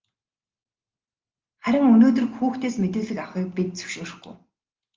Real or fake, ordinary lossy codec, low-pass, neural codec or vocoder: real; Opus, 24 kbps; 7.2 kHz; none